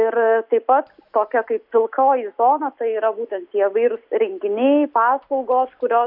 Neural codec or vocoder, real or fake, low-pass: none; real; 5.4 kHz